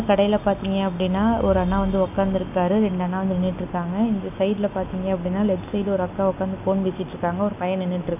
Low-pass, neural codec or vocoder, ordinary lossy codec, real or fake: 3.6 kHz; none; none; real